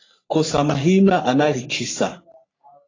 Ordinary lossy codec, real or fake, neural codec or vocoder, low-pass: AAC, 32 kbps; fake; codec, 44.1 kHz, 3.4 kbps, Pupu-Codec; 7.2 kHz